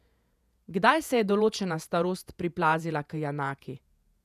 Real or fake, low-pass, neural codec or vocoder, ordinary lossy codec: fake; 14.4 kHz; vocoder, 48 kHz, 128 mel bands, Vocos; none